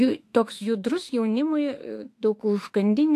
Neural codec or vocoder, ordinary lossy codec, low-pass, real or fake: autoencoder, 48 kHz, 32 numbers a frame, DAC-VAE, trained on Japanese speech; AAC, 64 kbps; 14.4 kHz; fake